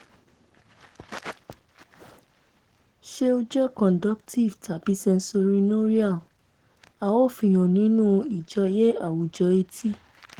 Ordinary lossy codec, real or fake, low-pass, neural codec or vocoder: Opus, 16 kbps; fake; 19.8 kHz; codec, 44.1 kHz, 7.8 kbps, Pupu-Codec